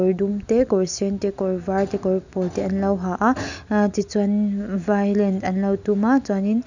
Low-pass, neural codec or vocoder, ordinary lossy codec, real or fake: 7.2 kHz; none; none; real